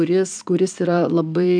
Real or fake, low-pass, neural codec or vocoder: fake; 9.9 kHz; vocoder, 44.1 kHz, 128 mel bands every 256 samples, BigVGAN v2